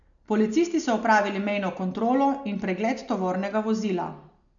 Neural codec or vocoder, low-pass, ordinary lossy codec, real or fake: none; 7.2 kHz; none; real